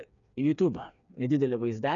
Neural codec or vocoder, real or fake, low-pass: codec, 16 kHz, 4 kbps, FreqCodec, smaller model; fake; 7.2 kHz